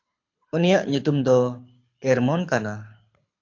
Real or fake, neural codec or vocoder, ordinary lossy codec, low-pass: fake; codec, 24 kHz, 6 kbps, HILCodec; AAC, 48 kbps; 7.2 kHz